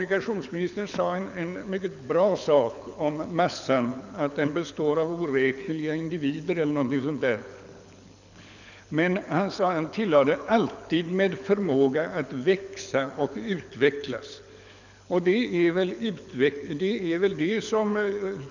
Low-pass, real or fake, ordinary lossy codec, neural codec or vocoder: 7.2 kHz; fake; none; codec, 24 kHz, 6 kbps, HILCodec